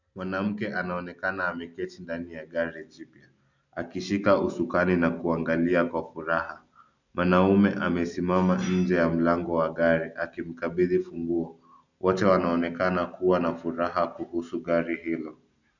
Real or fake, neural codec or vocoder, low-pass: real; none; 7.2 kHz